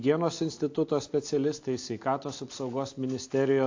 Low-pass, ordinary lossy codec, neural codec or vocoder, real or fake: 7.2 kHz; AAC, 48 kbps; none; real